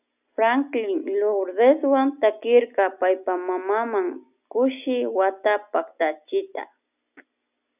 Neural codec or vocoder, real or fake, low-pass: none; real; 3.6 kHz